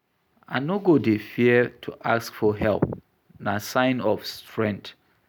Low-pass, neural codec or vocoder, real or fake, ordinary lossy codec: none; none; real; none